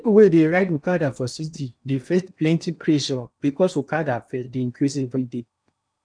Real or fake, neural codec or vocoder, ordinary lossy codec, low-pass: fake; codec, 16 kHz in and 24 kHz out, 0.8 kbps, FocalCodec, streaming, 65536 codes; none; 9.9 kHz